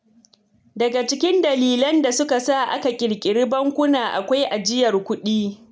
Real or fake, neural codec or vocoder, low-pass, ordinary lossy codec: real; none; none; none